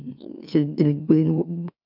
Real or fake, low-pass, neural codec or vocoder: fake; 5.4 kHz; autoencoder, 44.1 kHz, a latent of 192 numbers a frame, MeloTTS